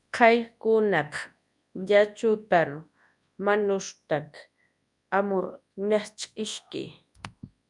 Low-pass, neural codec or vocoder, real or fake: 10.8 kHz; codec, 24 kHz, 0.9 kbps, WavTokenizer, large speech release; fake